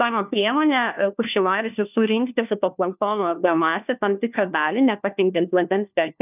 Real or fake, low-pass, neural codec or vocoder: fake; 3.6 kHz; codec, 16 kHz, 1 kbps, FunCodec, trained on LibriTTS, 50 frames a second